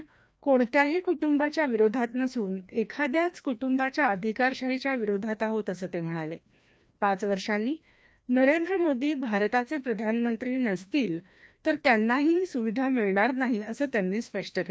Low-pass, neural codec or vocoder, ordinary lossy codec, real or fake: none; codec, 16 kHz, 1 kbps, FreqCodec, larger model; none; fake